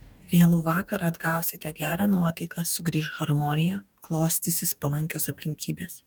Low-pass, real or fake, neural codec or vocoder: 19.8 kHz; fake; codec, 44.1 kHz, 2.6 kbps, DAC